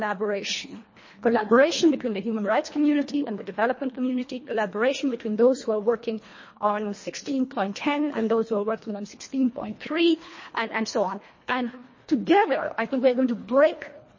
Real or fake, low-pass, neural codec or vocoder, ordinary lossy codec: fake; 7.2 kHz; codec, 24 kHz, 1.5 kbps, HILCodec; MP3, 32 kbps